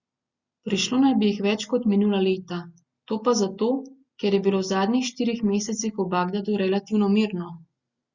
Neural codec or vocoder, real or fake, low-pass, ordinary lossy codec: none; real; 7.2 kHz; Opus, 64 kbps